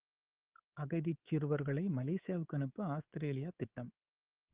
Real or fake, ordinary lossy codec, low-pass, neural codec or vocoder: fake; Opus, 16 kbps; 3.6 kHz; codec, 24 kHz, 3.1 kbps, DualCodec